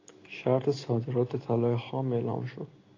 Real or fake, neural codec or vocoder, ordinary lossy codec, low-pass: real; none; AAC, 32 kbps; 7.2 kHz